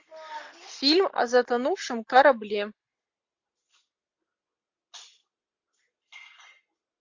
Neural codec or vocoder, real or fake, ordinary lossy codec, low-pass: none; real; MP3, 48 kbps; 7.2 kHz